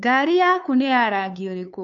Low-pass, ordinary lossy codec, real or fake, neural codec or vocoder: 7.2 kHz; none; fake; codec, 16 kHz, 2 kbps, FunCodec, trained on LibriTTS, 25 frames a second